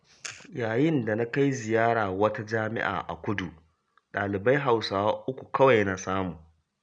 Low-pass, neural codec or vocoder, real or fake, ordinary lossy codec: 9.9 kHz; none; real; none